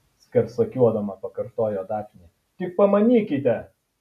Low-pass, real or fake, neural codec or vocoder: 14.4 kHz; real; none